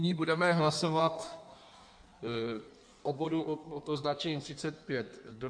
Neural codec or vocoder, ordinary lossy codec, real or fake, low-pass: codec, 16 kHz in and 24 kHz out, 1.1 kbps, FireRedTTS-2 codec; MP3, 96 kbps; fake; 9.9 kHz